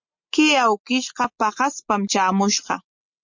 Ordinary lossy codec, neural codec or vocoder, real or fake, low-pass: MP3, 48 kbps; none; real; 7.2 kHz